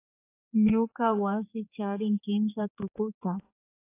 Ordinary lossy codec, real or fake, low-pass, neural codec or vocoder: AAC, 24 kbps; fake; 3.6 kHz; codec, 16 kHz, 4 kbps, X-Codec, HuBERT features, trained on general audio